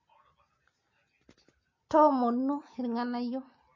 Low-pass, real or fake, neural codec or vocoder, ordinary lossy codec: 7.2 kHz; real; none; MP3, 32 kbps